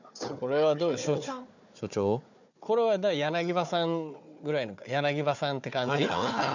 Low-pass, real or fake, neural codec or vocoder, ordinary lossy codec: 7.2 kHz; fake; codec, 16 kHz, 4 kbps, FunCodec, trained on Chinese and English, 50 frames a second; none